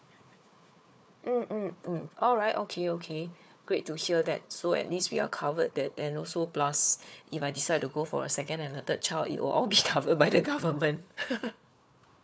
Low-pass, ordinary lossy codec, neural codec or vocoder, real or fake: none; none; codec, 16 kHz, 4 kbps, FunCodec, trained on Chinese and English, 50 frames a second; fake